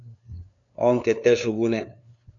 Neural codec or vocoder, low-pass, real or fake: codec, 16 kHz, 2 kbps, FunCodec, trained on LibriTTS, 25 frames a second; 7.2 kHz; fake